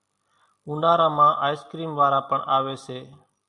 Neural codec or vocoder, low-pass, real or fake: none; 10.8 kHz; real